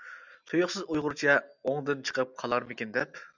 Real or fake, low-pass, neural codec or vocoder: real; 7.2 kHz; none